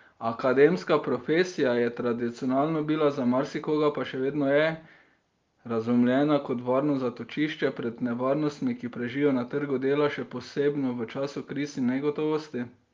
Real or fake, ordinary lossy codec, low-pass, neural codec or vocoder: real; Opus, 32 kbps; 7.2 kHz; none